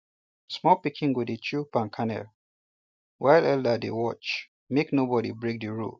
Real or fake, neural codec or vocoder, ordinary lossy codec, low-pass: real; none; none; none